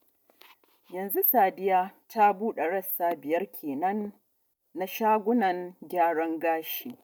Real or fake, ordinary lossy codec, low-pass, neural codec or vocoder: real; none; none; none